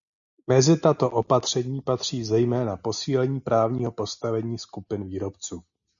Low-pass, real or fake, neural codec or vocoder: 7.2 kHz; real; none